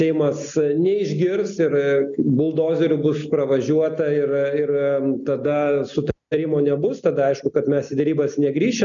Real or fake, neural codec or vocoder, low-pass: real; none; 7.2 kHz